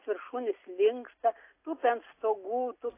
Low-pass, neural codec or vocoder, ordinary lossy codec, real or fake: 3.6 kHz; none; AAC, 24 kbps; real